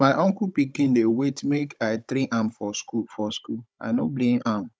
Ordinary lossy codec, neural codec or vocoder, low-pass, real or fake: none; codec, 16 kHz, 16 kbps, FunCodec, trained on Chinese and English, 50 frames a second; none; fake